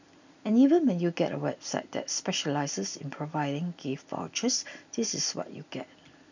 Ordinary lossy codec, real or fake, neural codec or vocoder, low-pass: none; real; none; 7.2 kHz